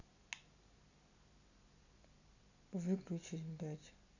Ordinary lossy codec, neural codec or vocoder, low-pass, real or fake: none; none; 7.2 kHz; real